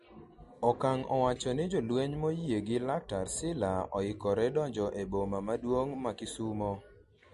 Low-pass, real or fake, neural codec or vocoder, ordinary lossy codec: 14.4 kHz; real; none; MP3, 48 kbps